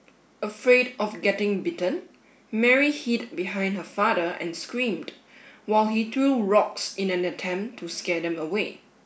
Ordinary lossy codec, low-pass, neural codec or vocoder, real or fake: none; none; none; real